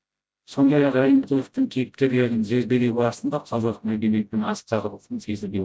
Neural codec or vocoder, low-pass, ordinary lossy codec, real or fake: codec, 16 kHz, 0.5 kbps, FreqCodec, smaller model; none; none; fake